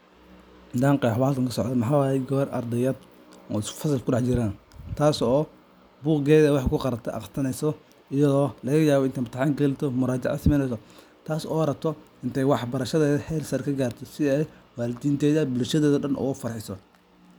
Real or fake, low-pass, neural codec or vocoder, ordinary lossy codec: real; none; none; none